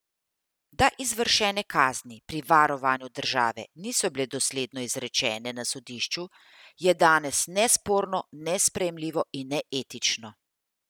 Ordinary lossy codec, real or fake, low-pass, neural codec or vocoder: none; real; none; none